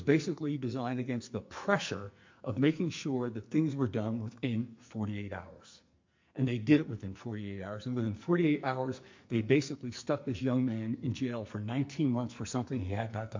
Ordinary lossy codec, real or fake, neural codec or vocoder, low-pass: MP3, 48 kbps; fake; codec, 44.1 kHz, 2.6 kbps, SNAC; 7.2 kHz